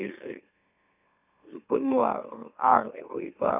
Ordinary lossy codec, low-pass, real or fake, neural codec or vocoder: none; 3.6 kHz; fake; autoencoder, 44.1 kHz, a latent of 192 numbers a frame, MeloTTS